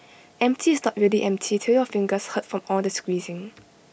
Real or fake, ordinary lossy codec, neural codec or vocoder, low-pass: real; none; none; none